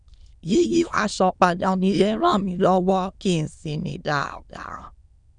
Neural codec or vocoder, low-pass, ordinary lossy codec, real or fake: autoencoder, 22.05 kHz, a latent of 192 numbers a frame, VITS, trained on many speakers; 9.9 kHz; none; fake